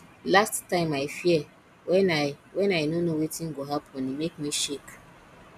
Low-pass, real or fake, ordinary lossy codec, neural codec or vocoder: 14.4 kHz; real; none; none